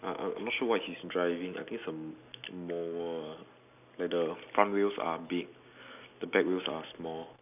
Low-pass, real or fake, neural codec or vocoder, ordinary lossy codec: 3.6 kHz; real; none; none